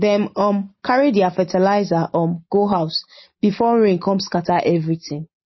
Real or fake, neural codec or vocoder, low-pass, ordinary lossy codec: real; none; 7.2 kHz; MP3, 24 kbps